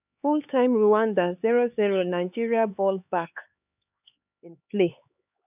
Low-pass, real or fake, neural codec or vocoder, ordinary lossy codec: 3.6 kHz; fake; codec, 16 kHz, 4 kbps, X-Codec, HuBERT features, trained on LibriSpeech; none